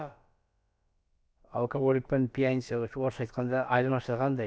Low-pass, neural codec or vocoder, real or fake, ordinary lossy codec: none; codec, 16 kHz, about 1 kbps, DyCAST, with the encoder's durations; fake; none